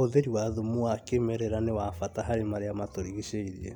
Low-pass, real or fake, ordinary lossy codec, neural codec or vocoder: 19.8 kHz; real; none; none